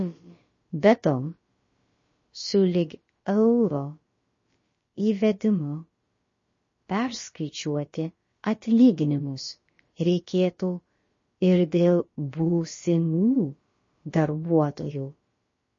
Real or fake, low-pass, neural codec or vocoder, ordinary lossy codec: fake; 7.2 kHz; codec, 16 kHz, about 1 kbps, DyCAST, with the encoder's durations; MP3, 32 kbps